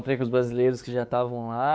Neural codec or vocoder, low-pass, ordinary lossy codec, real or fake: codec, 16 kHz, 4 kbps, X-Codec, WavLM features, trained on Multilingual LibriSpeech; none; none; fake